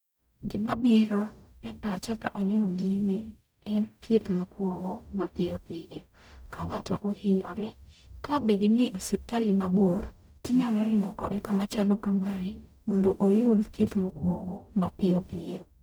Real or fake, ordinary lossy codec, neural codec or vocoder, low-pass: fake; none; codec, 44.1 kHz, 0.9 kbps, DAC; none